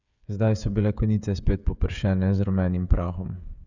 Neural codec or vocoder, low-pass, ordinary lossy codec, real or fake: codec, 16 kHz, 16 kbps, FreqCodec, smaller model; 7.2 kHz; none; fake